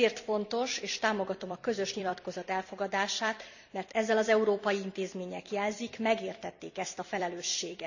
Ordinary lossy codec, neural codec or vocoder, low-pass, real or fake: none; vocoder, 44.1 kHz, 128 mel bands every 256 samples, BigVGAN v2; 7.2 kHz; fake